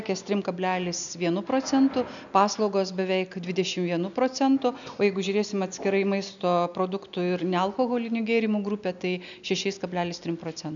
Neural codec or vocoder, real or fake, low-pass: none; real; 7.2 kHz